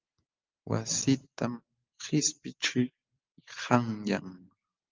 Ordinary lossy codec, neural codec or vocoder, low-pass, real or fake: Opus, 24 kbps; none; 7.2 kHz; real